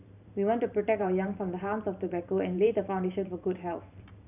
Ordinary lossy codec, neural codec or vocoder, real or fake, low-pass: none; vocoder, 44.1 kHz, 128 mel bands every 512 samples, BigVGAN v2; fake; 3.6 kHz